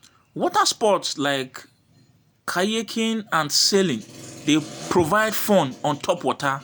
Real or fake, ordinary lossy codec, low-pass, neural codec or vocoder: real; none; none; none